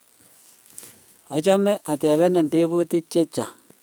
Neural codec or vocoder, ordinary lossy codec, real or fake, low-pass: codec, 44.1 kHz, 2.6 kbps, SNAC; none; fake; none